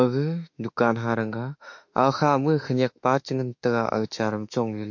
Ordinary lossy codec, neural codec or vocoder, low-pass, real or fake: MP3, 48 kbps; autoencoder, 48 kHz, 32 numbers a frame, DAC-VAE, trained on Japanese speech; 7.2 kHz; fake